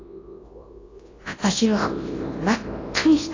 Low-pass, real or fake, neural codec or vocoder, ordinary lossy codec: 7.2 kHz; fake; codec, 24 kHz, 0.9 kbps, WavTokenizer, large speech release; AAC, 32 kbps